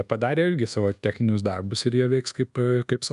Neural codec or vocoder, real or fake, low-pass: codec, 24 kHz, 1.2 kbps, DualCodec; fake; 10.8 kHz